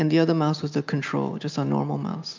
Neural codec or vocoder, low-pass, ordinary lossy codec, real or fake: none; 7.2 kHz; MP3, 64 kbps; real